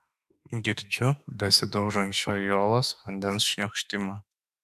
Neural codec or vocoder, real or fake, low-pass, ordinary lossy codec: autoencoder, 48 kHz, 32 numbers a frame, DAC-VAE, trained on Japanese speech; fake; 14.4 kHz; AAC, 96 kbps